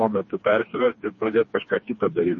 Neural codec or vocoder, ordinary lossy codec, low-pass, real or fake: codec, 16 kHz, 2 kbps, FreqCodec, smaller model; MP3, 32 kbps; 7.2 kHz; fake